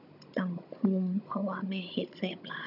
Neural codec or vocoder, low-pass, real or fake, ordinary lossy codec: codec, 16 kHz, 16 kbps, FunCodec, trained on Chinese and English, 50 frames a second; 5.4 kHz; fake; none